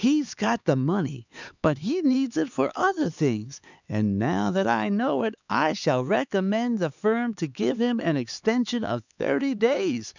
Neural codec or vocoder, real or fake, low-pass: codec, 16 kHz, 4 kbps, X-Codec, HuBERT features, trained on LibriSpeech; fake; 7.2 kHz